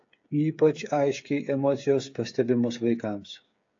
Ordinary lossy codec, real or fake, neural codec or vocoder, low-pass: AAC, 48 kbps; fake; codec, 16 kHz, 8 kbps, FreqCodec, smaller model; 7.2 kHz